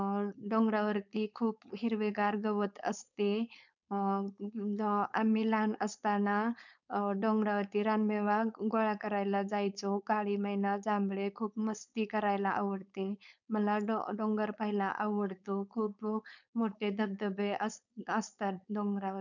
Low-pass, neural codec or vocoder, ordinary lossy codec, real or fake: 7.2 kHz; codec, 16 kHz, 4.8 kbps, FACodec; none; fake